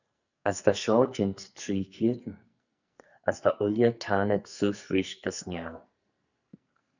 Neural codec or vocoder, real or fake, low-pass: codec, 44.1 kHz, 2.6 kbps, SNAC; fake; 7.2 kHz